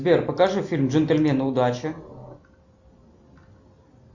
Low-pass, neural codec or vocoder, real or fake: 7.2 kHz; none; real